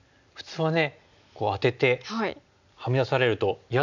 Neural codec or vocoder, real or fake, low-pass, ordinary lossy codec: none; real; 7.2 kHz; none